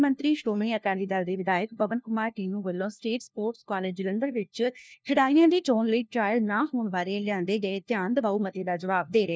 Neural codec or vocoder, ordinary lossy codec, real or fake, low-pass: codec, 16 kHz, 1 kbps, FunCodec, trained on LibriTTS, 50 frames a second; none; fake; none